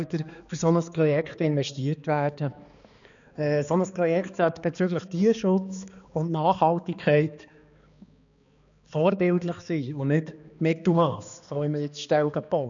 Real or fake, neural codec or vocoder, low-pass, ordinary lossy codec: fake; codec, 16 kHz, 2 kbps, X-Codec, HuBERT features, trained on balanced general audio; 7.2 kHz; none